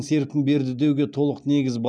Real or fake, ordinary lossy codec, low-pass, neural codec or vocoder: real; none; none; none